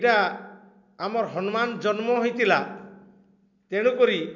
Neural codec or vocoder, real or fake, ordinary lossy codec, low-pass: none; real; none; 7.2 kHz